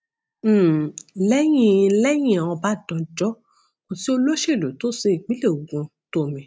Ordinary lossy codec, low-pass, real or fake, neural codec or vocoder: none; none; real; none